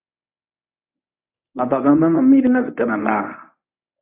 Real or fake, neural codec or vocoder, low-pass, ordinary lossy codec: fake; codec, 24 kHz, 0.9 kbps, WavTokenizer, medium speech release version 1; 3.6 kHz; AAC, 32 kbps